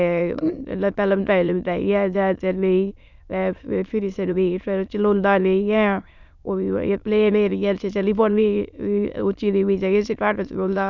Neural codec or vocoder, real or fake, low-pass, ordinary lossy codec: autoencoder, 22.05 kHz, a latent of 192 numbers a frame, VITS, trained on many speakers; fake; 7.2 kHz; none